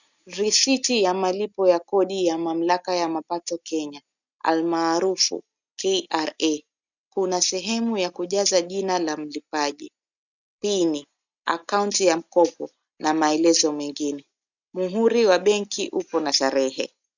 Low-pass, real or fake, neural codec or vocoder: 7.2 kHz; real; none